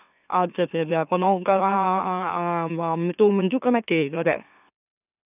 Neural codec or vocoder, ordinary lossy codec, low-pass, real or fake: autoencoder, 44.1 kHz, a latent of 192 numbers a frame, MeloTTS; none; 3.6 kHz; fake